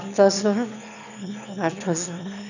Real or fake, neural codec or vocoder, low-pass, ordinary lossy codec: fake; autoencoder, 22.05 kHz, a latent of 192 numbers a frame, VITS, trained on one speaker; 7.2 kHz; none